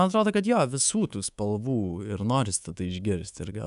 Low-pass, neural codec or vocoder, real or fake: 10.8 kHz; codec, 24 kHz, 3.1 kbps, DualCodec; fake